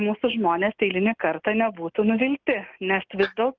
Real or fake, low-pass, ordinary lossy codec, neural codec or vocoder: real; 7.2 kHz; Opus, 32 kbps; none